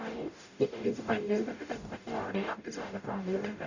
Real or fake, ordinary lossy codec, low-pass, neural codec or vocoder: fake; none; 7.2 kHz; codec, 44.1 kHz, 0.9 kbps, DAC